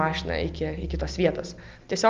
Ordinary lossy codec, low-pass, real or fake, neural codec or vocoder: Opus, 24 kbps; 7.2 kHz; real; none